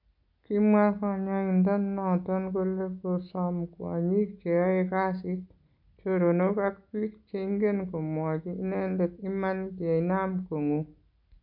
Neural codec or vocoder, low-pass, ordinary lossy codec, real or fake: none; 5.4 kHz; none; real